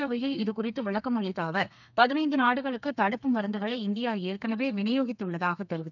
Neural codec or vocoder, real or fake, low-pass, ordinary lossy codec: codec, 32 kHz, 1.9 kbps, SNAC; fake; 7.2 kHz; none